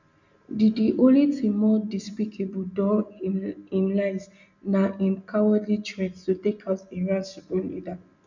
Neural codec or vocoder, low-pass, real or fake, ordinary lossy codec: none; 7.2 kHz; real; none